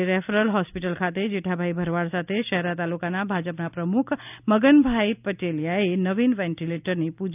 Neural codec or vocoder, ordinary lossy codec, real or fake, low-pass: none; none; real; 3.6 kHz